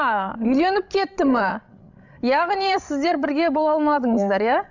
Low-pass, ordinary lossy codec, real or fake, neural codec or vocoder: 7.2 kHz; none; fake; codec, 16 kHz, 16 kbps, FreqCodec, larger model